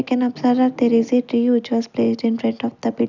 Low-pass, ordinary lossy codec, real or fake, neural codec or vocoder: 7.2 kHz; none; real; none